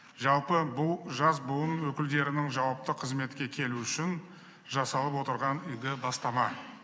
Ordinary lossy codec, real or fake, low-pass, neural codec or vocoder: none; real; none; none